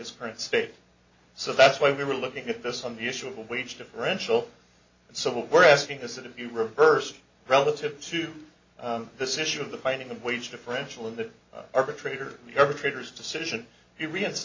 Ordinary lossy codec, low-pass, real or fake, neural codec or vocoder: MP3, 32 kbps; 7.2 kHz; real; none